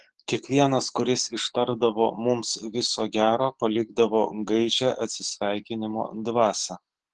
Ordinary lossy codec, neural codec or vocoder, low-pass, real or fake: Opus, 16 kbps; codec, 44.1 kHz, 7.8 kbps, DAC; 10.8 kHz; fake